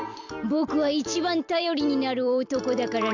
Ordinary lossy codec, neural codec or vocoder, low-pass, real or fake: none; vocoder, 44.1 kHz, 128 mel bands every 256 samples, BigVGAN v2; 7.2 kHz; fake